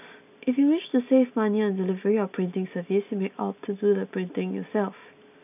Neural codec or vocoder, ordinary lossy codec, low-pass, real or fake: none; none; 3.6 kHz; real